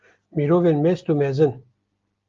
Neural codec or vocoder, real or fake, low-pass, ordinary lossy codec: none; real; 7.2 kHz; Opus, 32 kbps